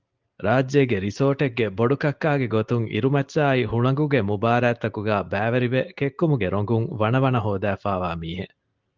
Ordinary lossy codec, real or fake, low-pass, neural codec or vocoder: Opus, 32 kbps; real; 7.2 kHz; none